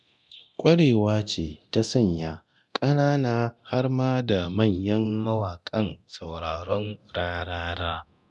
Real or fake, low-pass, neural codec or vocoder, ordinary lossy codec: fake; none; codec, 24 kHz, 0.9 kbps, DualCodec; none